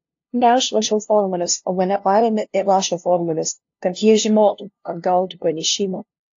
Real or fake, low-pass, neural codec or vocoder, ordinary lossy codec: fake; 7.2 kHz; codec, 16 kHz, 0.5 kbps, FunCodec, trained on LibriTTS, 25 frames a second; AAC, 48 kbps